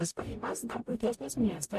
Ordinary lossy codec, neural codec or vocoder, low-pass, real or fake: MP3, 64 kbps; codec, 44.1 kHz, 0.9 kbps, DAC; 14.4 kHz; fake